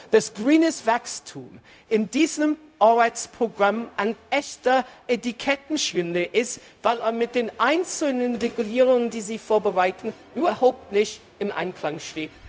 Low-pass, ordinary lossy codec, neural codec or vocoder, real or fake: none; none; codec, 16 kHz, 0.4 kbps, LongCat-Audio-Codec; fake